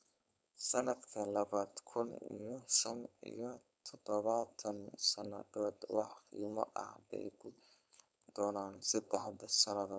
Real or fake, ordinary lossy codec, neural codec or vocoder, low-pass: fake; none; codec, 16 kHz, 4.8 kbps, FACodec; none